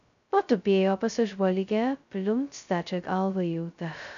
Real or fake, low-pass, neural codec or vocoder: fake; 7.2 kHz; codec, 16 kHz, 0.2 kbps, FocalCodec